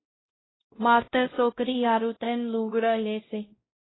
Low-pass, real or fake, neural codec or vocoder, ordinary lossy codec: 7.2 kHz; fake; codec, 16 kHz, 0.5 kbps, X-Codec, WavLM features, trained on Multilingual LibriSpeech; AAC, 16 kbps